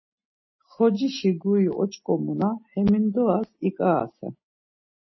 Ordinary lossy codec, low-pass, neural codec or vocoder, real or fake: MP3, 24 kbps; 7.2 kHz; none; real